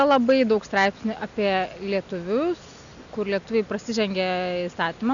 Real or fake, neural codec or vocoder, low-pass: real; none; 7.2 kHz